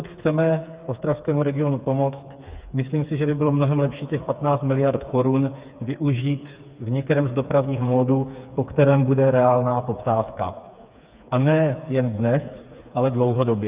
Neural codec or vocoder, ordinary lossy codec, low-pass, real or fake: codec, 16 kHz, 4 kbps, FreqCodec, smaller model; Opus, 64 kbps; 3.6 kHz; fake